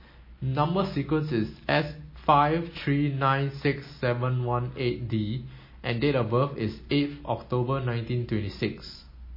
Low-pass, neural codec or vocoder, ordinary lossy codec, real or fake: 5.4 kHz; none; MP3, 24 kbps; real